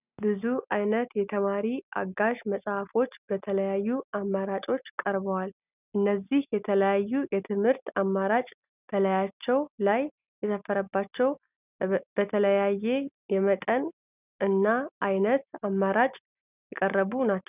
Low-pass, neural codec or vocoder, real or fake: 3.6 kHz; none; real